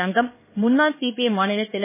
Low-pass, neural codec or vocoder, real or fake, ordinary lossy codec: 3.6 kHz; codec, 16 kHz, 2 kbps, X-Codec, HuBERT features, trained on LibriSpeech; fake; MP3, 16 kbps